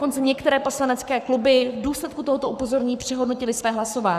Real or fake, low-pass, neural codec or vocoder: fake; 14.4 kHz; codec, 44.1 kHz, 7.8 kbps, Pupu-Codec